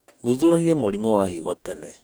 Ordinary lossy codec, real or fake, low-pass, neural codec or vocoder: none; fake; none; codec, 44.1 kHz, 2.6 kbps, DAC